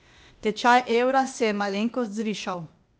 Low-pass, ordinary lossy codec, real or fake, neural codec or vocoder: none; none; fake; codec, 16 kHz, 0.8 kbps, ZipCodec